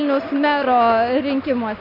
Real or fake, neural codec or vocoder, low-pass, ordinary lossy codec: real; none; 5.4 kHz; AAC, 48 kbps